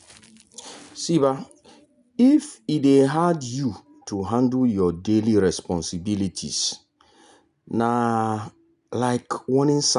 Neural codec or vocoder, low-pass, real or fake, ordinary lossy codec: none; 10.8 kHz; real; none